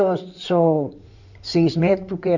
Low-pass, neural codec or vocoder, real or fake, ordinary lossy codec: 7.2 kHz; codec, 16 kHz in and 24 kHz out, 2.2 kbps, FireRedTTS-2 codec; fake; none